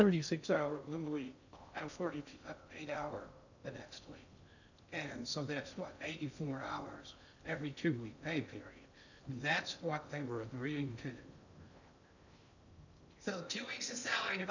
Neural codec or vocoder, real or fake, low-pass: codec, 16 kHz in and 24 kHz out, 0.6 kbps, FocalCodec, streaming, 2048 codes; fake; 7.2 kHz